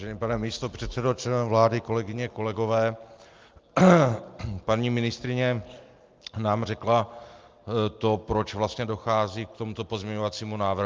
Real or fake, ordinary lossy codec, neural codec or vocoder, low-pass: real; Opus, 24 kbps; none; 7.2 kHz